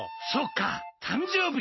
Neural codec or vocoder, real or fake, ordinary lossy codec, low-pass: none; real; MP3, 24 kbps; 7.2 kHz